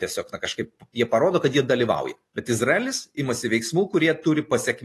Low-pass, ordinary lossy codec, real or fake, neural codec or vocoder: 14.4 kHz; AAC, 48 kbps; real; none